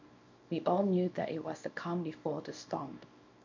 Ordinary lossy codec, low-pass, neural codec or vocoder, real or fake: MP3, 48 kbps; 7.2 kHz; codec, 24 kHz, 0.9 kbps, WavTokenizer, small release; fake